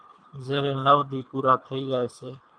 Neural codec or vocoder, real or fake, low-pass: codec, 24 kHz, 3 kbps, HILCodec; fake; 9.9 kHz